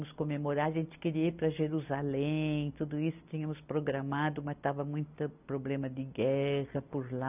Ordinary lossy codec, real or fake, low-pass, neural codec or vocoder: none; real; 3.6 kHz; none